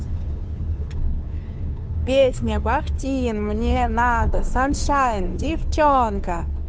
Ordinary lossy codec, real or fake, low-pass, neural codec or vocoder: none; fake; none; codec, 16 kHz, 2 kbps, FunCodec, trained on Chinese and English, 25 frames a second